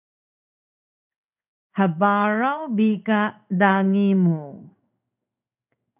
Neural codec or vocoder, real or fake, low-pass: codec, 16 kHz in and 24 kHz out, 1 kbps, XY-Tokenizer; fake; 3.6 kHz